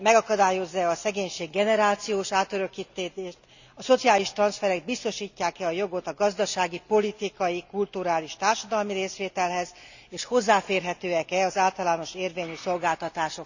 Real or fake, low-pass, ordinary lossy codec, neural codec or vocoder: real; 7.2 kHz; none; none